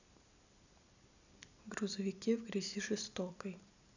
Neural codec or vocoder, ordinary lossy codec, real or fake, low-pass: none; none; real; 7.2 kHz